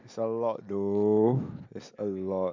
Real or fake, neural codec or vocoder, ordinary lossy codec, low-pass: real; none; MP3, 64 kbps; 7.2 kHz